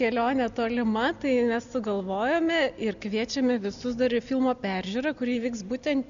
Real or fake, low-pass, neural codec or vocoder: real; 7.2 kHz; none